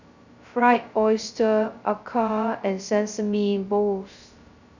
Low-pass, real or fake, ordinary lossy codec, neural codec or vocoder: 7.2 kHz; fake; none; codec, 16 kHz, 0.2 kbps, FocalCodec